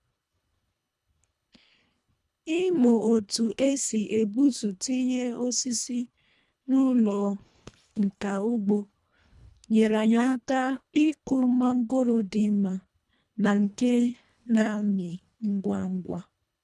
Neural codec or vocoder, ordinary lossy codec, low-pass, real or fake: codec, 24 kHz, 1.5 kbps, HILCodec; none; none; fake